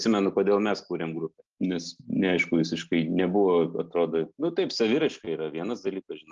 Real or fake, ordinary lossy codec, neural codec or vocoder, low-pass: real; Opus, 32 kbps; none; 7.2 kHz